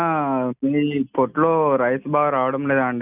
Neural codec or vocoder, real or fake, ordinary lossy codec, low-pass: none; real; none; 3.6 kHz